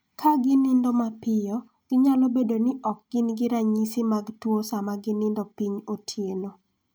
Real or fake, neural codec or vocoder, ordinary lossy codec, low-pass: real; none; none; none